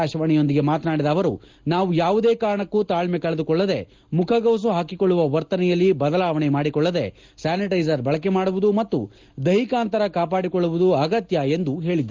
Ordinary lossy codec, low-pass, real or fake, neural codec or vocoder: Opus, 24 kbps; 7.2 kHz; real; none